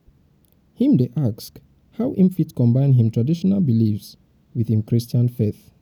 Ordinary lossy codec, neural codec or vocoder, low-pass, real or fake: none; none; 19.8 kHz; real